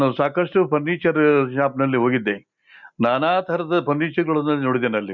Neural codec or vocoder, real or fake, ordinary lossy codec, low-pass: none; real; MP3, 64 kbps; 7.2 kHz